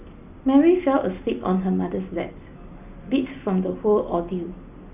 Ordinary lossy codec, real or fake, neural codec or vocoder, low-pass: none; real; none; 3.6 kHz